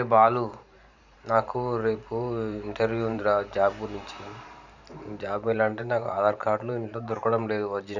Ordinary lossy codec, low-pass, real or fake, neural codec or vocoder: none; 7.2 kHz; fake; vocoder, 44.1 kHz, 128 mel bands every 512 samples, BigVGAN v2